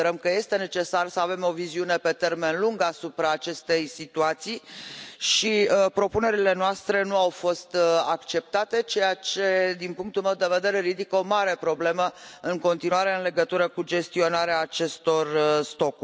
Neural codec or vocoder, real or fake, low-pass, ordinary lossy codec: none; real; none; none